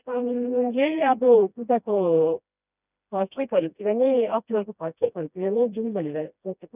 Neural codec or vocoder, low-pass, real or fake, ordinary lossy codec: codec, 16 kHz, 1 kbps, FreqCodec, smaller model; 3.6 kHz; fake; none